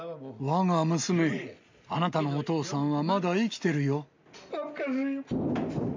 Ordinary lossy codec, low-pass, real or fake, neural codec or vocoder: MP3, 64 kbps; 7.2 kHz; real; none